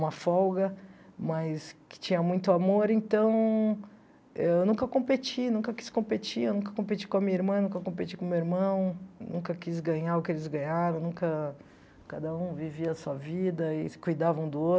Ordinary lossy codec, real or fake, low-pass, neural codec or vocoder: none; real; none; none